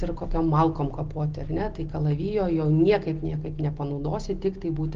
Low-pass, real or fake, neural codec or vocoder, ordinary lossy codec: 7.2 kHz; real; none; Opus, 16 kbps